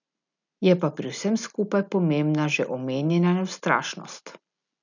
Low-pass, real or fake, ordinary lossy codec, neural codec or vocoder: 7.2 kHz; real; none; none